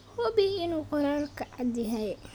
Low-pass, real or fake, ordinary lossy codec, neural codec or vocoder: none; real; none; none